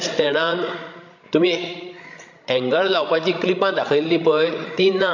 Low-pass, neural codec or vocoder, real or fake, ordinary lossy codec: 7.2 kHz; codec, 16 kHz, 16 kbps, FreqCodec, larger model; fake; MP3, 48 kbps